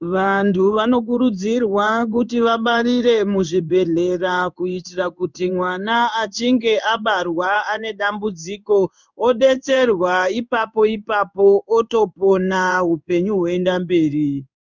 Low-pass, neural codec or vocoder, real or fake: 7.2 kHz; codec, 16 kHz in and 24 kHz out, 1 kbps, XY-Tokenizer; fake